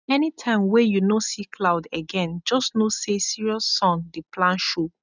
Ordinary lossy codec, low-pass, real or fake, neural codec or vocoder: none; 7.2 kHz; real; none